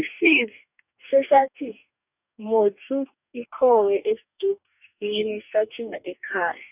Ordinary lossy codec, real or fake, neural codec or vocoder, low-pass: none; fake; codec, 44.1 kHz, 2.6 kbps, DAC; 3.6 kHz